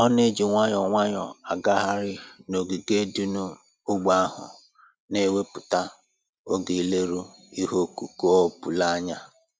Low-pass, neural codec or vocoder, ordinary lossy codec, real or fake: none; none; none; real